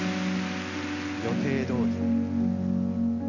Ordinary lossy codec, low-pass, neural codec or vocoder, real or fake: none; 7.2 kHz; none; real